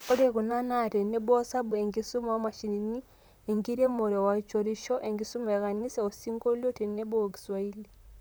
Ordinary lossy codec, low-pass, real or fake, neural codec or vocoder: none; none; fake; vocoder, 44.1 kHz, 128 mel bands, Pupu-Vocoder